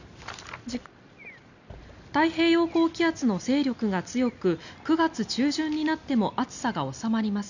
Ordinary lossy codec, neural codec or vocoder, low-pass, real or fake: none; none; 7.2 kHz; real